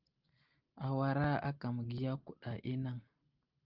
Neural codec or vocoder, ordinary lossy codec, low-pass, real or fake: none; Opus, 24 kbps; 5.4 kHz; real